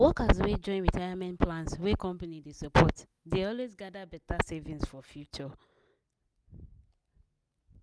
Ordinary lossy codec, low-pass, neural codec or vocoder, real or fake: none; none; none; real